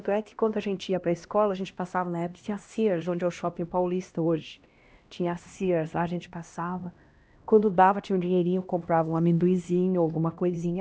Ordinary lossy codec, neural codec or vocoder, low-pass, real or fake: none; codec, 16 kHz, 1 kbps, X-Codec, HuBERT features, trained on LibriSpeech; none; fake